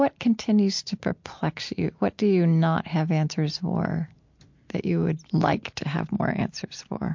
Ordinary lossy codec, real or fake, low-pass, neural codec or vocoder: MP3, 48 kbps; real; 7.2 kHz; none